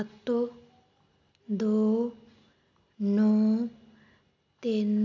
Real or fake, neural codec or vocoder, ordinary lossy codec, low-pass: real; none; none; 7.2 kHz